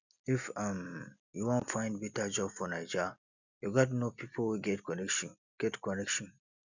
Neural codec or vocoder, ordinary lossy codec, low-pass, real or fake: none; none; 7.2 kHz; real